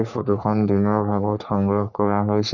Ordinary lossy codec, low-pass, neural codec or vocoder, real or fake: none; 7.2 kHz; codec, 44.1 kHz, 3.4 kbps, Pupu-Codec; fake